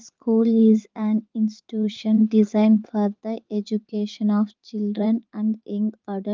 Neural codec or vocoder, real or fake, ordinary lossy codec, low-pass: vocoder, 44.1 kHz, 80 mel bands, Vocos; fake; Opus, 32 kbps; 7.2 kHz